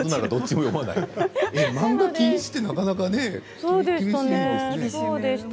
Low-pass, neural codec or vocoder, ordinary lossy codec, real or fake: none; none; none; real